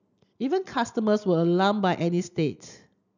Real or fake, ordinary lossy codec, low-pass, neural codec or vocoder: real; none; 7.2 kHz; none